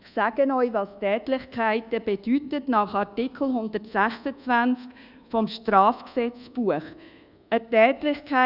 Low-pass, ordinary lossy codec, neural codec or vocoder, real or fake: 5.4 kHz; none; codec, 24 kHz, 1.2 kbps, DualCodec; fake